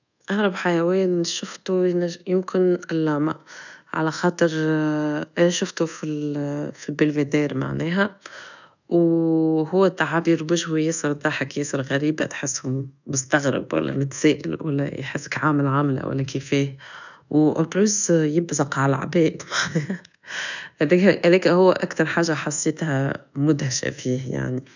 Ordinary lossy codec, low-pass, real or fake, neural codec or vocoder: none; 7.2 kHz; fake; codec, 24 kHz, 1.2 kbps, DualCodec